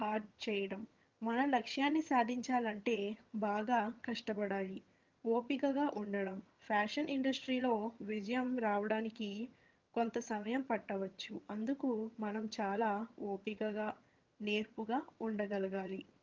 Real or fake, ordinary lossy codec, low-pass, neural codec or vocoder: fake; Opus, 24 kbps; 7.2 kHz; vocoder, 22.05 kHz, 80 mel bands, HiFi-GAN